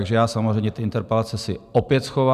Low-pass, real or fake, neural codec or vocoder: 14.4 kHz; real; none